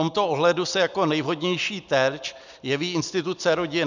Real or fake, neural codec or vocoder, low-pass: real; none; 7.2 kHz